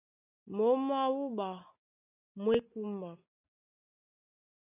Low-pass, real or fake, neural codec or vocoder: 3.6 kHz; real; none